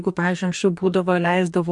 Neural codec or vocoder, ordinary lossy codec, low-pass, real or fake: codec, 44.1 kHz, 2.6 kbps, DAC; MP3, 64 kbps; 10.8 kHz; fake